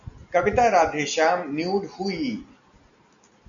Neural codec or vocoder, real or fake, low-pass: none; real; 7.2 kHz